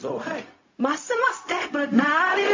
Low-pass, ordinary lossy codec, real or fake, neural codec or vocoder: 7.2 kHz; MP3, 32 kbps; fake; codec, 16 kHz, 0.4 kbps, LongCat-Audio-Codec